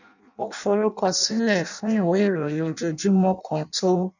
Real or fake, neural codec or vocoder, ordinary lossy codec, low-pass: fake; codec, 16 kHz in and 24 kHz out, 0.6 kbps, FireRedTTS-2 codec; none; 7.2 kHz